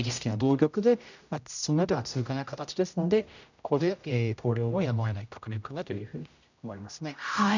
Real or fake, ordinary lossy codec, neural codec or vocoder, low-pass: fake; none; codec, 16 kHz, 0.5 kbps, X-Codec, HuBERT features, trained on general audio; 7.2 kHz